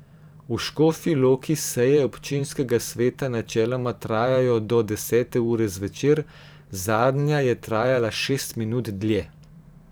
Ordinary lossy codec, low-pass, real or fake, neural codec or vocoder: none; none; fake; vocoder, 44.1 kHz, 128 mel bands every 512 samples, BigVGAN v2